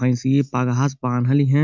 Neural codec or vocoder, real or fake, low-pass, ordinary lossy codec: none; real; 7.2 kHz; MP3, 64 kbps